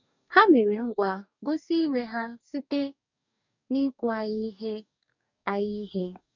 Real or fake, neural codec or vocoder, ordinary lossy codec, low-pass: fake; codec, 44.1 kHz, 2.6 kbps, DAC; none; 7.2 kHz